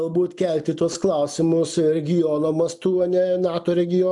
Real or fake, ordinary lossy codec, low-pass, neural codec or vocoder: real; MP3, 96 kbps; 10.8 kHz; none